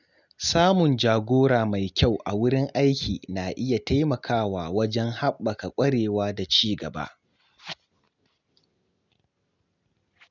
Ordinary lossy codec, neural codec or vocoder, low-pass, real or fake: none; none; 7.2 kHz; real